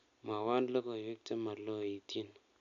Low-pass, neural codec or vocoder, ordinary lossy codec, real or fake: 7.2 kHz; none; none; real